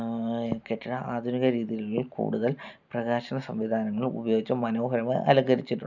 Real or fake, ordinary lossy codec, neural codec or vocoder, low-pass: real; none; none; 7.2 kHz